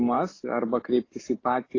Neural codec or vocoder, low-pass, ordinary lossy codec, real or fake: none; 7.2 kHz; AAC, 32 kbps; real